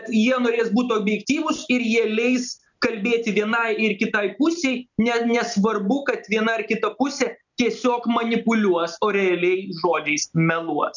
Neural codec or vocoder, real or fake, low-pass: none; real; 7.2 kHz